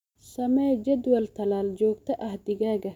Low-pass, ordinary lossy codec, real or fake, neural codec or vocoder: 19.8 kHz; none; real; none